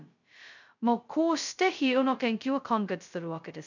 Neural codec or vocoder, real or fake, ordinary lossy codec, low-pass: codec, 16 kHz, 0.2 kbps, FocalCodec; fake; none; 7.2 kHz